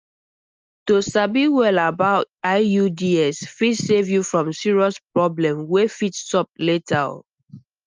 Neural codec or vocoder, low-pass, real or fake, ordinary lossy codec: none; 7.2 kHz; real; Opus, 24 kbps